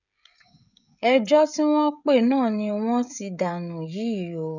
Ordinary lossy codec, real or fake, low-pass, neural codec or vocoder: none; fake; 7.2 kHz; codec, 16 kHz, 16 kbps, FreqCodec, smaller model